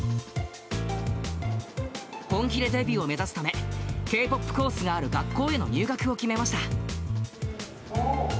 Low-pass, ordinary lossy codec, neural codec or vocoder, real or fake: none; none; none; real